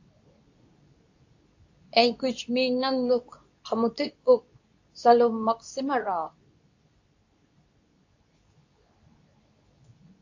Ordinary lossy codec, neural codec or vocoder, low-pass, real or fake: AAC, 48 kbps; codec, 24 kHz, 0.9 kbps, WavTokenizer, medium speech release version 2; 7.2 kHz; fake